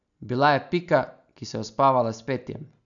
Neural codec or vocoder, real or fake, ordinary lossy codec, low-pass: none; real; none; 7.2 kHz